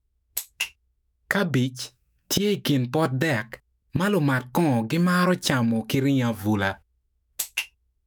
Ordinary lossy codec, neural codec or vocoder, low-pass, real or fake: none; autoencoder, 48 kHz, 128 numbers a frame, DAC-VAE, trained on Japanese speech; none; fake